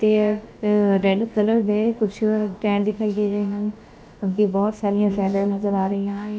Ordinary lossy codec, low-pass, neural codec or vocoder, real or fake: none; none; codec, 16 kHz, about 1 kbps, DyCAST, with the encoder's durations; fake